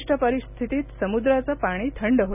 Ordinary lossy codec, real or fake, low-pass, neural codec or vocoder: none; real; 3.6 kHz; none